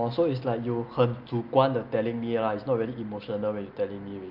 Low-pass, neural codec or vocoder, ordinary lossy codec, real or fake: 5.4 kHz; none; Opus, 32 kbps; real